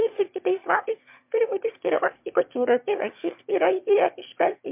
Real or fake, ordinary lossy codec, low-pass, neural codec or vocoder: fake; MP3, 32 kbps; 3.6 kHz; autoencoder, 22.05 kHz, a latent of 192 numbers a frame, VITS, trained on one speaker